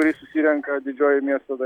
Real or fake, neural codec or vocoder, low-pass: real; none; 19.8 kHz